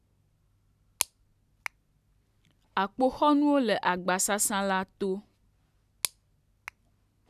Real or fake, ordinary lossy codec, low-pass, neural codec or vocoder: real; none; 14.4 kHz; none